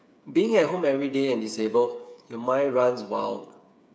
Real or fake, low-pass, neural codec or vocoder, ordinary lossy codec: fake; none; codec, 16 kHz, 8 kbps, FreqCodec, smaller model; none